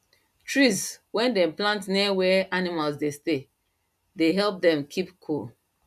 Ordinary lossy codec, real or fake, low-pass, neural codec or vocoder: none; real; 14.4 kHz; none